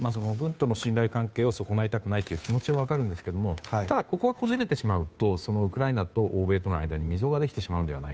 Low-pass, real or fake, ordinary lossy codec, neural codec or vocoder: none; fake; none; codec, 16 kHz, 2 kbps, FunCodec, trained on Chinese and English, 25 frames a second